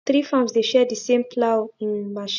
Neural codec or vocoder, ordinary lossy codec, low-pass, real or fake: none; none; 7.2 kHz; real